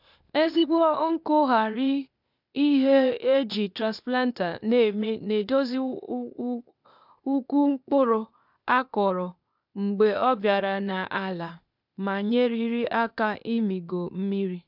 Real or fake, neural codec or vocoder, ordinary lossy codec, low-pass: fake; codec, 16 kHz, 0.8 kbps, ZipCodec; none; 5.4 kHz